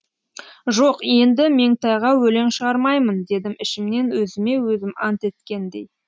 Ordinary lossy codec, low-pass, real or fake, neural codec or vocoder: none; none; real; none